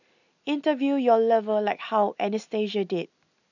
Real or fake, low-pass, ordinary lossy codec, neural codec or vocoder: real; 7.2 kHz; none; none